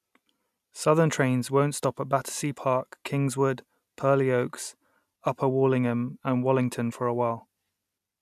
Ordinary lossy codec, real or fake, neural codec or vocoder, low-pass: none; real; none; 14.4 kHz